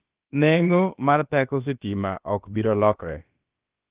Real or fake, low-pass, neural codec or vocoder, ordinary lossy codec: fake; 3.6 kHz; codec, 16 kHz, about 1 kbps, DyCAST, with the encoder's durations; Opus, 32 kbps